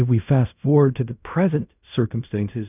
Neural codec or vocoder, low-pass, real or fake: codec, 16 kHz in and 24 kHz out, 0.4 kbps, LongCat-Audio-Codec, fine tuned four codebook decoder; 3.6 kHz; fake